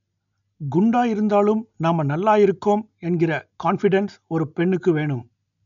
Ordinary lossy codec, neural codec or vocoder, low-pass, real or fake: none; none; 7.2 kHz; real